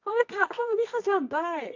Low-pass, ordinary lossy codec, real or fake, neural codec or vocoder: 7.2 kHz; AAC, 48 kbps; fake; codec, 24 kHz, 0.9 kbps, WavTokenizer, medium music audio release